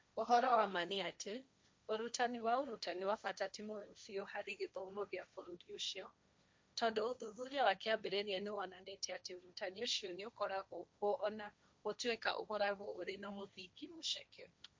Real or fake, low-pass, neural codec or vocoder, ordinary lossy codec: fake; none; codec, 16 kHz, 1.1 kbps, Voila-Tokenizer; none